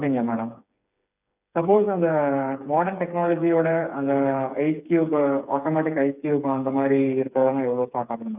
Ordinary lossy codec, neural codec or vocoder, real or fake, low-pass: none; codec, 16 kHz, 4 kbps, FreqCodec, smaller model; fake; 3.6 kHz